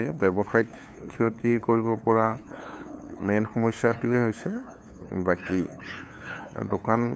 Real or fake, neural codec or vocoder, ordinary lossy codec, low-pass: fake; codec, 16 kHz, 2 kbps, FunCodec, trained on LibriTTS, 25 frames a second; none; none